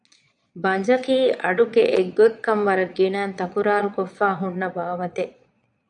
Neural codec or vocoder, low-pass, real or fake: vocoder, 22.05 kHz, 80 mel bands, Vocos; 9.9 kHz; fake